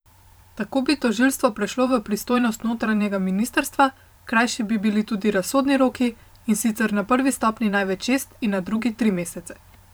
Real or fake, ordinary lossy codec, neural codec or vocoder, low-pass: fake; none; vocoder, 44.1 kHz, 128 mel bands every 512 samples, BigVGAN v2; none